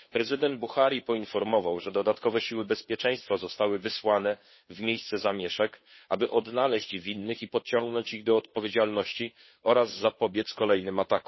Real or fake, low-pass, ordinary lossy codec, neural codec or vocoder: fake; 7.2 kHz; MP3, 24 kbps; codec, 16 kHz, 2 kbps, FunCodec, trained on Chinese and English, 25 frames a second